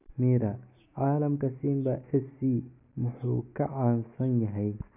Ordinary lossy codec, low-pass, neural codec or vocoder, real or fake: none; 3.6 kHz; none; real